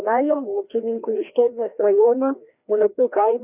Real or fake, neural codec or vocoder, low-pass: fake; codec, 16 kHz, 1 kbps, FreqCodec, larger model; 3.6 kHz